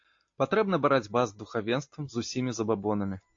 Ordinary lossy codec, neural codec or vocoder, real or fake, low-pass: AAC, 48 kbps; none; real; 7.2 kHz